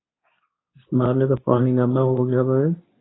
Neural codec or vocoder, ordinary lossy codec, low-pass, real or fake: codec, 24 kHz, 0.9 kbps, WavTokenizer, medium speech release version 2; AAC, 16 kbps; 7.2 kHz; fake